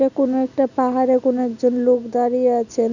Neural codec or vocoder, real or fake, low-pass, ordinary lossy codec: none; real; 7.2 kHz; none